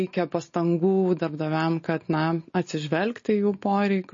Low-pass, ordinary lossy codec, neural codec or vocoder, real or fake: 7.2 kHz; MP3, 32 kbps; none; real